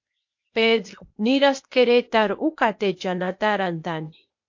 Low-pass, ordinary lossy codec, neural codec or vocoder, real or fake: 7.2 kHz; MP3, 48 kbps; codec, 16 kHz, 0.8 kbps, ZipCodec; fake